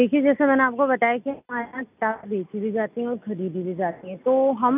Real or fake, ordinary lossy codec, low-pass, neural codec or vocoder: real; none; 3.6 kHz; none